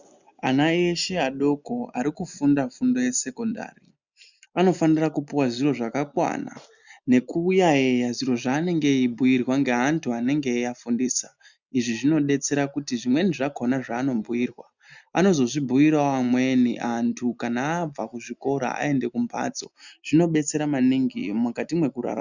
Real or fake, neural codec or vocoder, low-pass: real; none; 7.2 kHz